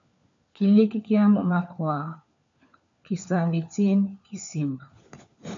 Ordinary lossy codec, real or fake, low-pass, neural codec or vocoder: MP3, 64 kbps; fake; 7.2 kHz; codec, 16 kHz, 4 kbps, FunCodec, trained on LibriTTS, 50 frames a second